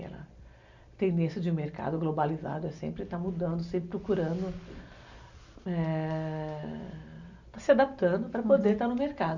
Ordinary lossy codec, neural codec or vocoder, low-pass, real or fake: none; none; 7.2 kHz; real